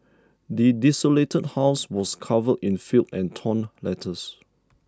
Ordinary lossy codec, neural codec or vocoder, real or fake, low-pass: none; none; real; none